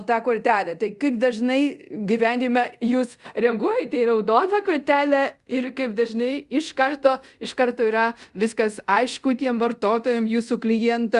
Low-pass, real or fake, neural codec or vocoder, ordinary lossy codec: 10.8 kHz; fake; codec, 24 kHz, 0.5 kbps, DualCodec; Opus, 64 kbps